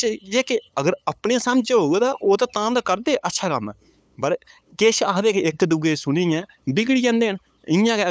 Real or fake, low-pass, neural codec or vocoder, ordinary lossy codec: fake; none; codec, 16 kHz, 8 kbps, FunCodec, trained on LibriTTS, 25 frames a second; none